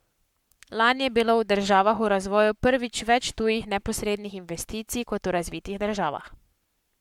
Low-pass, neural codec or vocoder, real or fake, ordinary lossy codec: 19.8 kHz; codec, 44.1 kHz, 7.8 kbps, Pupu-Codec; fake; MP3, 96 kbps